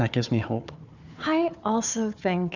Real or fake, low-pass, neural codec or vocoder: fake; 7.2 kHz; codec, 16 kHz, 4 kbps, FunCodec, trained on Chinese and English, 50 frames a second